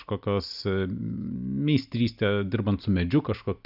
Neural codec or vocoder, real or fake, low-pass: none; real; 5.4 kHz